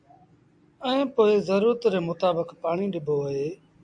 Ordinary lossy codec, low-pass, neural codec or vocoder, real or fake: AAC, 64 kbps; 9.9 kHz; none; real